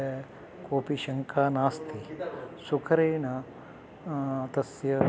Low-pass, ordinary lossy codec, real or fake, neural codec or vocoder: none; none; real; none